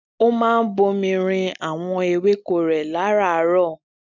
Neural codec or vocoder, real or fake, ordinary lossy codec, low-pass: none; real; none; 7.2 kHz